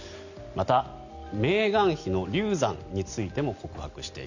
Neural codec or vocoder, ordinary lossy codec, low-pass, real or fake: none; none; 7.2 kHz; real